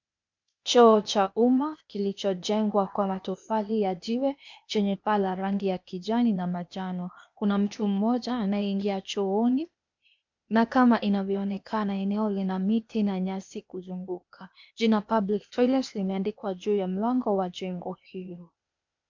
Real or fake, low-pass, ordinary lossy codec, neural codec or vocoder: fake; 7.2 kHz; MP3, 64 kbps; codec, 16 kHz, 0.8 kbps, ZipCodec